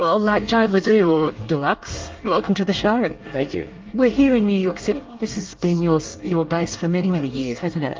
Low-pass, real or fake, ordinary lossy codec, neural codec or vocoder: 7.2 kHz; fake; Opus, 32 kbps; codec, 24 kHz, 1 kbps, SNAC